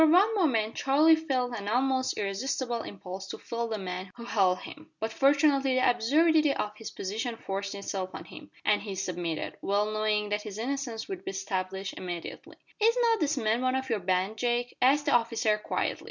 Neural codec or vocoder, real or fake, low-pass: none; real; 7.2 kHz